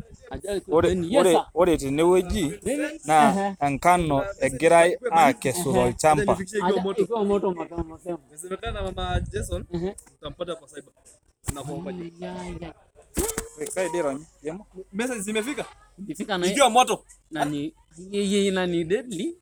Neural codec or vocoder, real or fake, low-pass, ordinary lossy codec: none; real; none; none